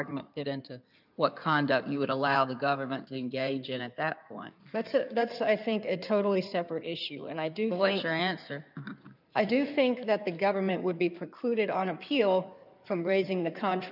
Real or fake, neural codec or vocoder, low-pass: fake; codec, 16 kHz in and 24 kHz out, 2.2 kbps, FireRedTTS-2 codec; 5.4 kHz